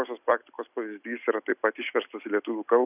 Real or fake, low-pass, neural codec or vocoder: real; 3.6 kHz; none